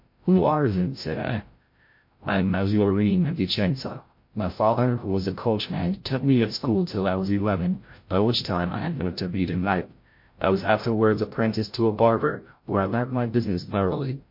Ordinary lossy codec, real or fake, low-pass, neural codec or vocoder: MP3, 32 kbps; fake; 5.4 kHz; codec, 16 kHz, 0.5 kbps, FreqCodec, larger model